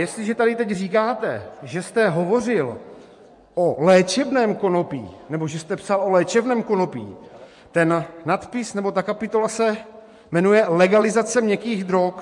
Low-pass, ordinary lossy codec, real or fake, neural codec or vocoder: 10.8 kHz; MP3, 64 kbps; fake; vocoder, 24 kHz, 100 mel bands, Vocos